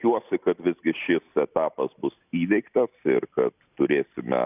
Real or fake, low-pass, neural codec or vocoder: real; 3.6 kHz; none